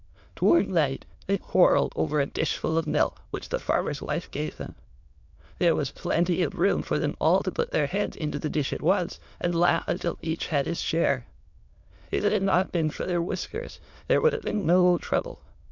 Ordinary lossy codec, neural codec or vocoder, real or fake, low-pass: MP3, 64 kbps; autoencoder, 22.05 kHz, a latent of 192 numbers a frame, VITS, trained on many speakers; fake; 7.2 kHz